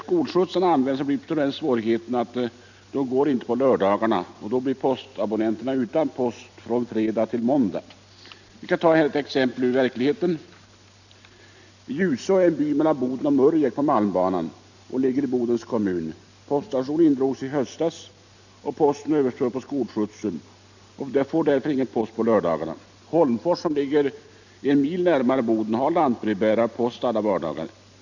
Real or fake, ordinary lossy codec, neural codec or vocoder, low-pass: real; none; none; 7.2 kHz